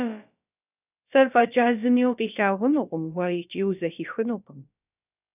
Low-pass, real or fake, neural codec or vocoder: 3.6 kHz; fake; codec, 16 kHz, about 1 kbps, DyCAST, with the encoder's durations